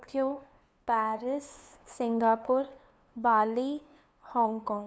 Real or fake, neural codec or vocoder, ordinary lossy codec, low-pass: fake; codec, 16 kHz, 2 kbps, FunCodec, trained on LibriTTS, 25 frames a second; none; none